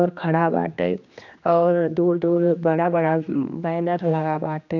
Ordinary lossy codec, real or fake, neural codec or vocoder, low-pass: none; fake; codec, 16 kHz, 2 kbps, X-Codec, HuBERT features, trained on general audio; 7.2 kHz